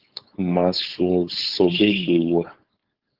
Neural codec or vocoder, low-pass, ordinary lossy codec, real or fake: codec, 16 kHz, 4.8 kbps, FACodec; 5.4 kHz; Opus, 16 kbps; fake